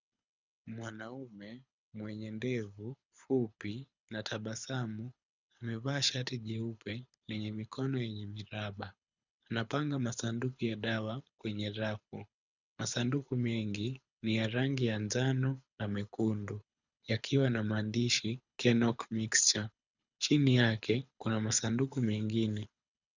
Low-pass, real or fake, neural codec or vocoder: 7.2 kHz; fake; codec, 24 kHz, 6 kbps, HILCodec